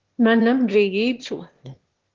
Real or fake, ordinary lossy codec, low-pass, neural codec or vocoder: fake; Opus, 16 kbps; 7.2 kHz; autoencoder, 22.05 kHz, a latent of 192 numbers a frame, VITS, trained on one speaker